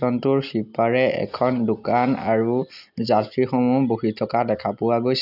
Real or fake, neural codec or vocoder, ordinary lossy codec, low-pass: real; none; none; 5.4 kHz